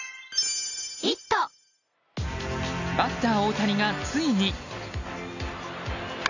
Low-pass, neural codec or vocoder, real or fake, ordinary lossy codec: 7.2 kHz; none; real; none